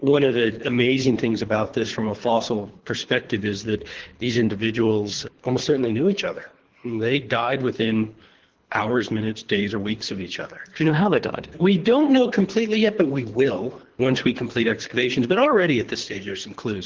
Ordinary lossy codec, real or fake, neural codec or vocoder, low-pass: Opus, 16 kbps; fake; codec, 24 kHz, 3 kbps, HILCodec; 7.2 kHz